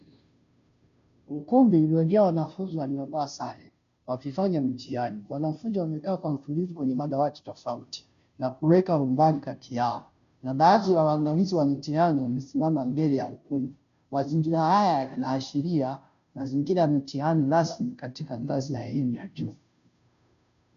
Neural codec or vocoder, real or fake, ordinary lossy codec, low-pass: codec, 16 kHz, 0.5 kbps, FunCodec, trained on Chinese and English, 25 frames a second; fake; MP3, 96 kbps; 7.2 kHz